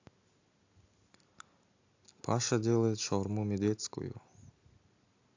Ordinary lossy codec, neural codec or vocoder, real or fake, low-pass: AAC, 48 kbps; none; real; 7.2 kHz